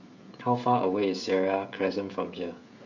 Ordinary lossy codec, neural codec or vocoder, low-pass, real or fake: none; codec, 16 kHz, 16 kbps, FreqCodec, smaller model; 7.2 kHz; fake